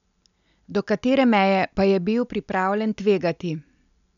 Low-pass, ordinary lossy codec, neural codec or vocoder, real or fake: 7.2 kHz; none; none; real